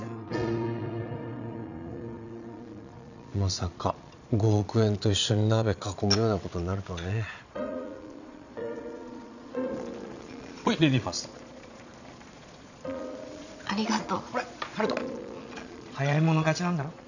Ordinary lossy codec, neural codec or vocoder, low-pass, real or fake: none; vocoder, 22.05 kHz, 80 mel bands, Vocos; 7.2 kHz; fake